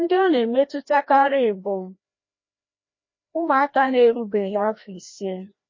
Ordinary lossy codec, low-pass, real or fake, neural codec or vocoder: MP3, 32 kbps; 7.2 kHz; fake; codec, 16 kHz, 1 kbps, FreqCodec, larger model